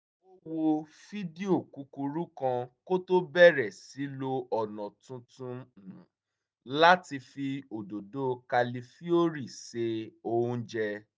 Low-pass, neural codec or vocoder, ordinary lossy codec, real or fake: none; none; none; real